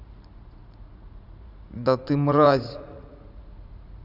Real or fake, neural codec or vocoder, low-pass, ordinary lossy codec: fake; vocoder, 44.1 kHz, 80 mel bands, Vocos; 5.4 kHz; none